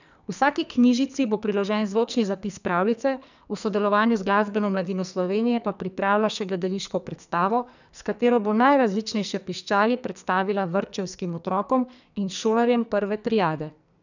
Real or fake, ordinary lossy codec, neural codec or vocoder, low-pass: fake; none; codec, 44.1 kHz, 2.6 kbps, SNAC; 7.2 kHz